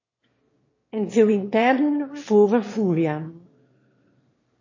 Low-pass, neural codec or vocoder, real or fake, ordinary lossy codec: 7.2 kHz; autoencoder, 22.05 kHz, a latent of 192 numbers a frame, VITS, trained on one speaker; fake; MP3, 32 kbps